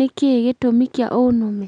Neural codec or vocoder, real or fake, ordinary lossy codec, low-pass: none; real; none; 9.9 kHz